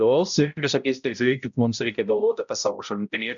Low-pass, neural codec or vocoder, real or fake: 7.2 kHz; codec, 16 kHz, 0.5 kbps, X-Codec, HuBERT features, trained on balanced general audio; fake